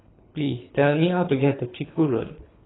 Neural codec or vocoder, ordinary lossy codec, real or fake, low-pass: codec, 24 kHz, 3 kbps, HILCodec; AAC, 16 kbps; fake; 7.2 kHz